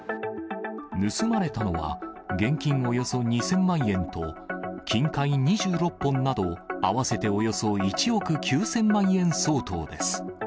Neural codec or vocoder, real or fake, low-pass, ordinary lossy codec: none; real; none; none